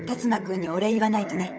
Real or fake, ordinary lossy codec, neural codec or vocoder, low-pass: fake; none; codec, 16 kHz, 16 kbps, FunCodec, trained on LibriTTS, 50 frames a second; none